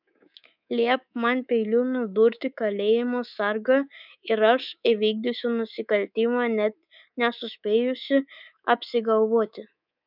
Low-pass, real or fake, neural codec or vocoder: 5.4 kHz; fake; codec, 24 kHz, 3.1 kbps, DualCodec